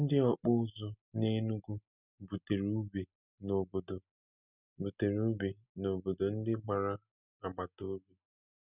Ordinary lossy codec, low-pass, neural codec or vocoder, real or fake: none; 3.6 kHz; none; real